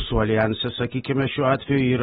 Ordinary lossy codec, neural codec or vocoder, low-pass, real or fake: AAC, 16 kbps; none; 19.8 kHz; real